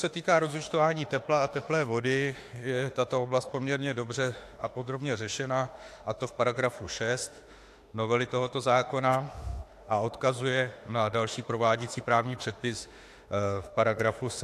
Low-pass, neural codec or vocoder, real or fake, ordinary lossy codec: 14.4 kHz; autoencoder, 48 kHz, 32 numbers a frame, DAC-VAE, trained on Japanese speech; fake; AAC, 64 kbps